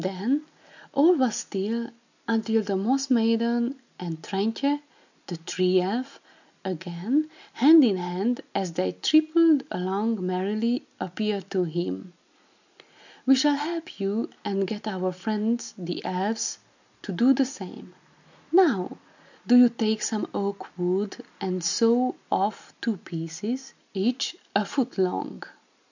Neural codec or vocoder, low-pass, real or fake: none; 7.2 kHz; real